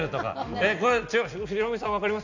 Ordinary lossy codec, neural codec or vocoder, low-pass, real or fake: none; none; 7.2 kHz; real